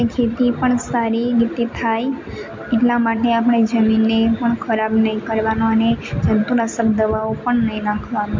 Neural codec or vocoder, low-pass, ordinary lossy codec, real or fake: none; 7.2 kHz; MP3, 48 kbps; real